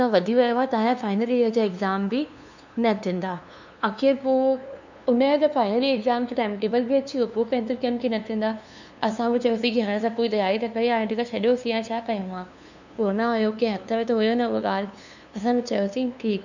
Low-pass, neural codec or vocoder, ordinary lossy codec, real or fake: 7.2 kHz; codec, 16 kHz, 2 kbps, FunCodec, trained on LibriTTS, 25 frames a second; none; fake